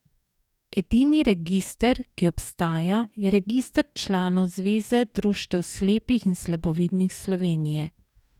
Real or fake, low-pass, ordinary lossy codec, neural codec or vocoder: fake; 19.8 kHz; none; codec, 44.1 kHz, 2.6 kbps, DAC